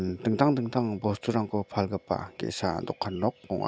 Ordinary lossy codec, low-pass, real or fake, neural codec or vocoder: none; none; real; none